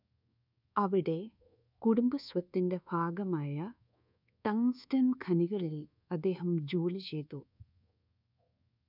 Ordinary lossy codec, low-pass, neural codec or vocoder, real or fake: none; 5.4 kHz; codec, 24 kHz, 1.2 kbps, DualCodec; fake